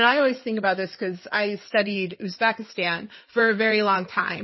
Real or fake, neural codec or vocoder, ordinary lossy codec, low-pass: fake; codec, 16 kHz in and 24 kHz out, 2.2 kbps, FireRedTTS-2 codec; MP3, 24 kbps; 7.2 kHz